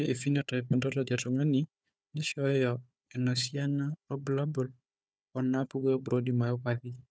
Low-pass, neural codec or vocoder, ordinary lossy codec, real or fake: none; codec, 16 kHz, 4 kbps, FunCodec, trained on Chinese and English, 50 frames a second; none; fake